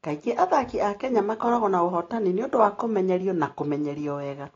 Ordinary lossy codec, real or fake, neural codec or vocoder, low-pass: AAC, 32 kbps; real; none; 7.2 kHz